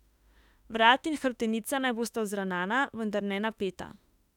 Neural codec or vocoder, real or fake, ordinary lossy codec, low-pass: autoencoder, 48 kHz, 32 numbers a frame, DAC-VAE, trained on Japanese speech; fake; none; 19.8 kHz